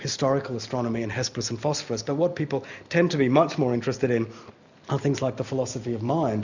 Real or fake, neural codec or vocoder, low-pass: real; none; 7.2 kHz